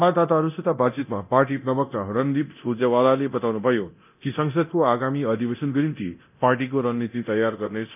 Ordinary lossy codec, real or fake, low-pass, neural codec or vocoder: none; fake; 3.6 kHz; codec, 24 kHz, 0.9 kbps, DualCodec